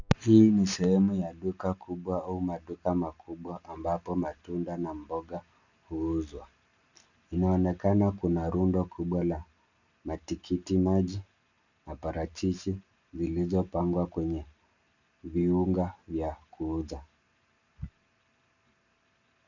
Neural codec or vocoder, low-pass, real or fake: none; 7.2 kHz; real